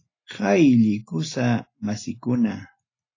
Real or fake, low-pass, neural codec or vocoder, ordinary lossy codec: real; 7.2 kHz; none; AAC, 32 kbps